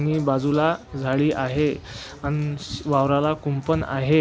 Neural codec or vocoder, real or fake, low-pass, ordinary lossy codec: none; real; none; none